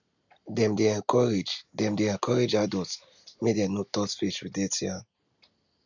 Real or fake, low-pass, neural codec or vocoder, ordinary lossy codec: real; 7.2 kHz; none; none